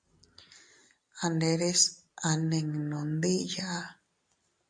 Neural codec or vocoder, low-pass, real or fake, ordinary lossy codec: none; 9.9 kHz; real; AAC, 64 kbps